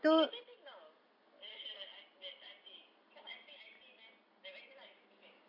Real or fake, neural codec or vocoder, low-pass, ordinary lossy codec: real; none; 5.4 kHz; none